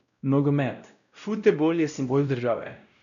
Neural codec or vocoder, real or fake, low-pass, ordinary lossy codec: codec, 16 kHz, 0.5 kbps, X-Codec, WavLM features, trained on Multilingual LibriSpeech; fake; 7.2 kHz; none